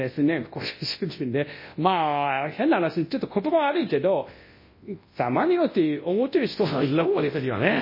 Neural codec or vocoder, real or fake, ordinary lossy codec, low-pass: codec, 24 kHz, 0.9 kbps, WavTokenizer, large speech release; fake; MP3, 24 kbps; 5.4 kHz